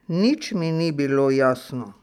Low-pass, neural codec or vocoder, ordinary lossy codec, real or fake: 19.8 kHz; none; none; real